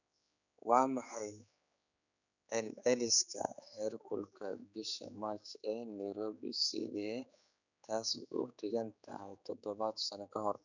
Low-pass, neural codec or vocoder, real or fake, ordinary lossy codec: 7.2 kHz; codec, 16 kHz, 4 kbps, X-Codec, HuBERT features, trained on general audio; fake; none